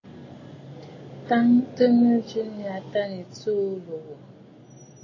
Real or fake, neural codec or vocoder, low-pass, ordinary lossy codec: real; none; 7.2 kHz; AAC, 32 kbps